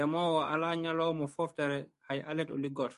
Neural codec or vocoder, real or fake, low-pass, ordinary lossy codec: codec, 44.1 kHz, 7.8 kbps, DAC; fake; 14.4 kHz; MP3, 48 kbps